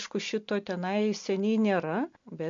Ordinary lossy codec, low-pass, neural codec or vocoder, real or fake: MP3, 48 kbps; 7.2 kHz; none; real